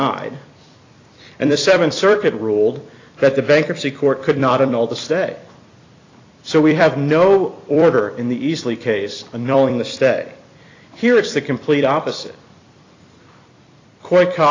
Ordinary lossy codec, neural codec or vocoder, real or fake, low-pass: AAC, 32 kbps; vocoder, 44.1 kHz, 128 mel bands every 256 samples, BigVGAN v2; fake; 7.2 kHz